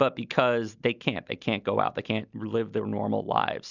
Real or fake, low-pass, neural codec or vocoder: real; 7.2 kHz; none